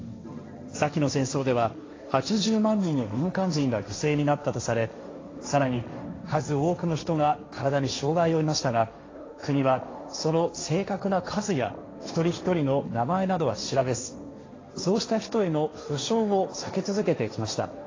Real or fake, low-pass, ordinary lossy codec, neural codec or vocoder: fake; 7.2 kHz; AAC, 32 kbps; codec, 16 kHz, 1.1 kbps, Voila-Tokenizer